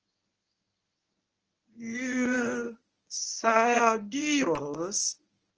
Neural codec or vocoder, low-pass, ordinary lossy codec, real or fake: codec, 24 kHz, 0.9 kbps, WavTokenizer, medium speech release version 1; 7.2 kHz; Opus, 24 kbps; fake